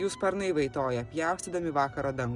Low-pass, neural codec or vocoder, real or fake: 10.8 kHz; none; real